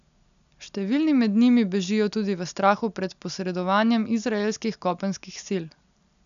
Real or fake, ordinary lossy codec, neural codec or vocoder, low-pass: real; none; none; 7.2 kHz